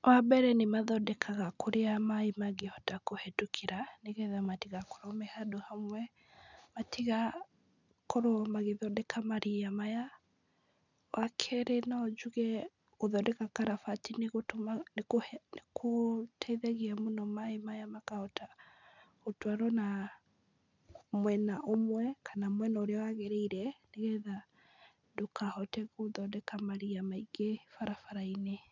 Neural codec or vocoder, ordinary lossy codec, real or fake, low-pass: none; none; real; 7.2 kHz